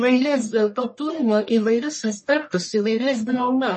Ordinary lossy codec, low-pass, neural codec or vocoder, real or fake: MP3, 32 kbps; 10.8 kHz; codec, 44.1 kHz, 1.7 kbps, Pupu-Codec; fake